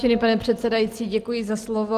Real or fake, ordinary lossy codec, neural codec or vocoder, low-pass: real; Opus, 24 kbps; none; 14.4 kHz